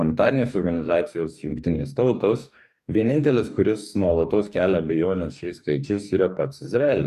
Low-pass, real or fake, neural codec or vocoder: 14.4 kHz; fake; codec, 44.1 kHz, 2.6 kbps, DAC